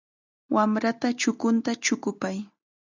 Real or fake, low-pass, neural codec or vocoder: real; 7.2 kHz; none